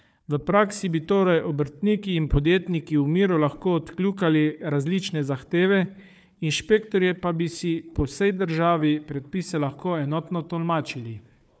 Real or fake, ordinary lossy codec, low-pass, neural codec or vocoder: fake; none; none; codec, 16 kHz, 4 kbps, FunCodec, trained on Chinese and English, 50 frames a second